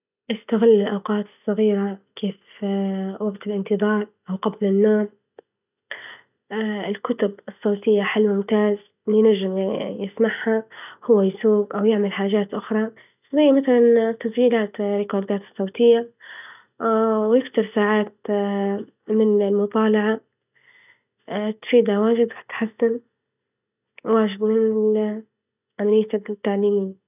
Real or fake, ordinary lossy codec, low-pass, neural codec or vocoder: real; none; 3.6 kHz; none